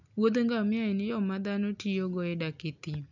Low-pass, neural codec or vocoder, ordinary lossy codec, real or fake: 7.2 kHz; none; none; real